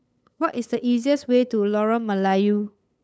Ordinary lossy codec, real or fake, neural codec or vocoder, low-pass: none; fake; codec, 16 kHz, 8 kbps, FunCodec, trained on LibriTTS, 25 frames a second; none